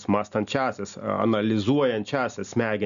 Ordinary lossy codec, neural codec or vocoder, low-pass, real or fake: MP3, 96 kbps; none; 7.2 kHz; real